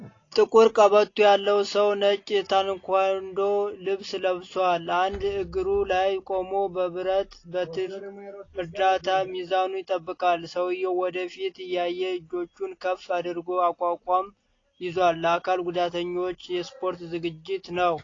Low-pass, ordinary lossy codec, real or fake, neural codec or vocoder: 7.2 kHz; AAC, 32 kbps; real; none